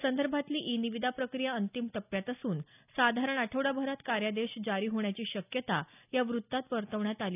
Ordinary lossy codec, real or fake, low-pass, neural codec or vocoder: none; real; 3.6 kHz; none